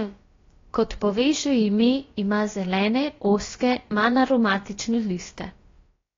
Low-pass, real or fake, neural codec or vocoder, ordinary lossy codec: 7.2 kHz; fake; codec, 16 kHz, about 1 kbps, DyCAST, with the encoder's durations; AAC, 32 kbps